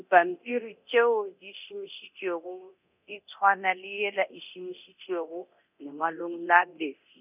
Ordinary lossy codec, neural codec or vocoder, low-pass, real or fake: none; codec, 24 kHz, 0.9 kbps, DualCodec; 3.6 kHz; fake